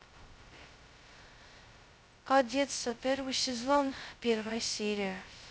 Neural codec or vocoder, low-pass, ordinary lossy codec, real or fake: codec, 16 kHz, 0.2 kbps, FocalCodec; none; none; fake